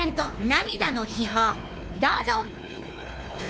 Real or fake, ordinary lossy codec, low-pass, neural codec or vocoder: fake; none; none; codec, 16 kHz, 2 kbps, X-Codec, WavLM features, trained on Multilingual LibriSpeech